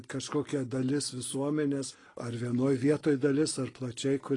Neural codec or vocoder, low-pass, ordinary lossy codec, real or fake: none; 10.8 kHz; AAC, 32 kbps; real